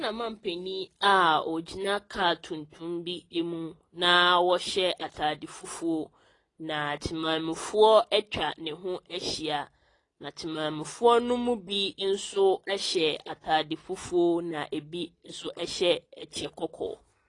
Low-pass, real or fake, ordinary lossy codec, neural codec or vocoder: 10.8 kHz; real; AAC, 32 kbps; none